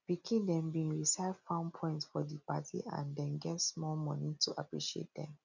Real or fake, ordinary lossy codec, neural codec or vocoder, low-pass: real; none; none; 7.2 kHz